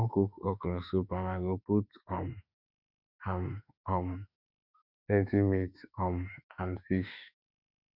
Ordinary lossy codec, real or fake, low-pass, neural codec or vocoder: Opus, 64 kbps; fake; 5.4 kHz; autoencoder, 48 kHz, 32 numbers a frame, DAC-VAE, trained on Japanese speech